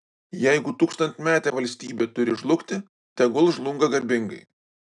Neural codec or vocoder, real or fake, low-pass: none; real; 10.8 kHz